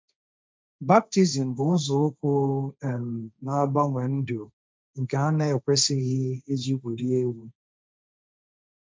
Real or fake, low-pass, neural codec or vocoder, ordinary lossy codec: fake; none; codec, 16 kHz, 1.1 kbps, Voila-Tokenizer; none